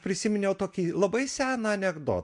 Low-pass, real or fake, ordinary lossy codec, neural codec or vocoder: 10.8 kHz; real; MP3, 64 kbps; none